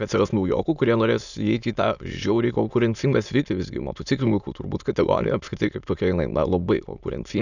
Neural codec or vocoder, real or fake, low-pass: autoencoder, 22.05 kHz, a latent of 192 numbers a frame, VITS, trained on many speakers; fake; 7.2 kHz